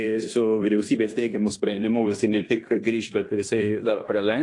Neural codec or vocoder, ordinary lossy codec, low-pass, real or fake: codec, 16 kHz in and 24 kHz out, 0.9 kbps, LongCat-Audio-Codec, fine tuned four codebook decoder; AAC, 48 kbps; 10.8 kHz; fake